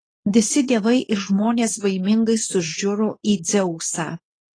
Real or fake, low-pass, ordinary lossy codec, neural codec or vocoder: fake; 9.9 kHz; AAC, 32 kbps; codec, 24 kHz, 0.9 kbps, WavTokenizer, medium speech release version 2